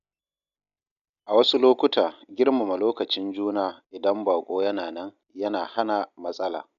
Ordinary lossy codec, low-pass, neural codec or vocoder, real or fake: none; 7.2 kHz; none; real